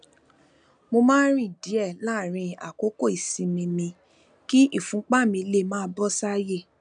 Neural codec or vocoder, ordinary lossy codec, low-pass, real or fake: none; none; 9.9 kHz; real